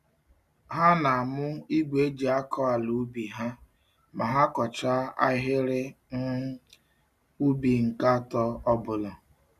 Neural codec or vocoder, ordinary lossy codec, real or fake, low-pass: none; none; real; 14.4 kHz